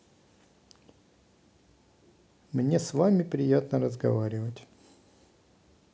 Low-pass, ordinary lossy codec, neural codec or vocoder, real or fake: none; none; none; real